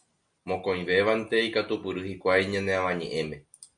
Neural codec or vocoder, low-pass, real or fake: none; 9.9 kHz; real